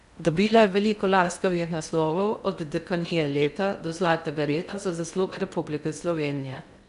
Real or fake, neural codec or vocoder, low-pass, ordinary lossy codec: fake; codec, 16 kHz in and 24 kHz out, 0.6 kbps, FocalCodec, streaming, 2048 codes; 10.8 kHz; none